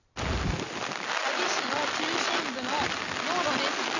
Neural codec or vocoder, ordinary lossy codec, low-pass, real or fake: none; none; 7.2 kHz; real